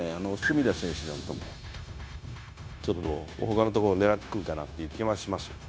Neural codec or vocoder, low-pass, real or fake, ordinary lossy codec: codec, 16 kHz, 0.9 kbps, LongCat-Audio-Codec; none; fake; none